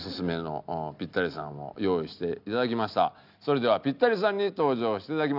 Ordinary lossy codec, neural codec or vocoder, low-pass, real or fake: none; none; 5.4 kHz; real